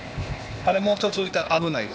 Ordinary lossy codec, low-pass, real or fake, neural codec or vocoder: none; none; fake; codec, 16 kHz, 0.8 kbps, ZipCodec